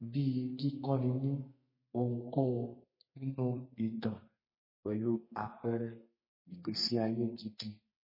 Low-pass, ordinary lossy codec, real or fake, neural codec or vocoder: 5.4 kHz; MP3, 24 kbps; fake; codec, 32 kHz, 1.9 kbps, SNAC